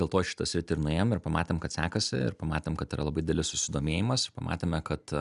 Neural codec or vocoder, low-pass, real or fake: none; 10.8 kHz; real